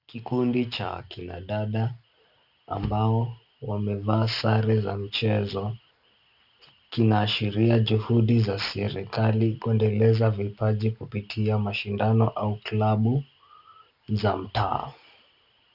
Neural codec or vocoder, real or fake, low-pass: none; real; 5.4 kHz